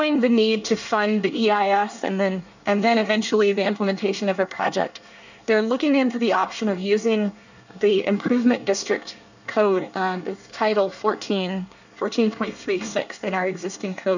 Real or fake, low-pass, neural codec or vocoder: fake; 7.2 kHz; codec, 24 kHz, 1 kbps, SNAC